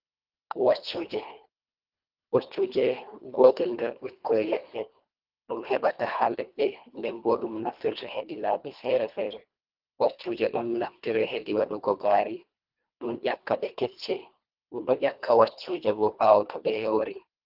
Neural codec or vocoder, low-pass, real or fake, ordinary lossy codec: codec, 24 kHz, 1.5 kbps, HILCodec; 5.4 kHz; fake; Opus, 32 kbps